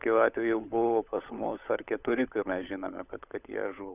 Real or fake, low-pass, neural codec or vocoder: fake; 3.6 kHz; codec, 16 kHz, 16 kbps, FunCodec, trained on LibriTTS, 50 frames a second